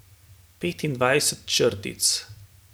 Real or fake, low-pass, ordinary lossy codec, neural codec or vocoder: real; none; none; none